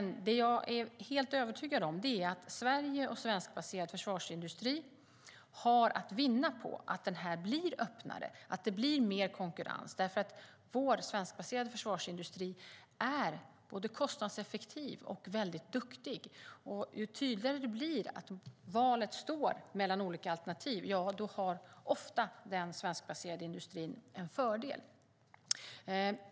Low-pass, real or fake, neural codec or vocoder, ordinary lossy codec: none; real; none; none